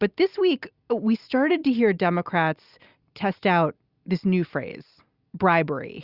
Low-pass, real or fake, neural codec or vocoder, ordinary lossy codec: 5.4 kHz; real; none; Opus, 64 kbps